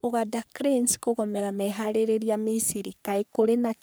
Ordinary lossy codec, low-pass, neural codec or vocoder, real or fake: none; none; codec, 44.1 kHz, 3.4 kbps, Pupu-Codec; fake